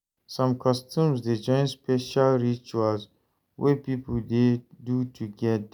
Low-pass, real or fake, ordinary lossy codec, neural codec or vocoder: none; real; none; none